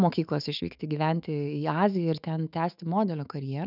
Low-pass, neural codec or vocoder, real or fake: 5.4 kHz; autoencoder, 48 kHz, 128 numbers a frame, DAC-VAE, trained on Japanese speech; fake